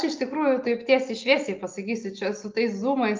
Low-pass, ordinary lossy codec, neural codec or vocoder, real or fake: 7.2 kHz; Opus, 24 kbps; none; real